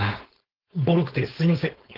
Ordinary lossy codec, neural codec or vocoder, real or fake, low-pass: Opus, 16 kbps; codec, 16 kHz, 4.8 kbps, FACodec; fake; 5.4 kHz